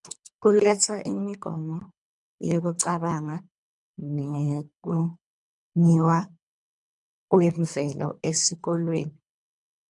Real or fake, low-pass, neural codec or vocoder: fake; 10.8 kHz; codec, 24 kHz, 3 kbps, HILCodec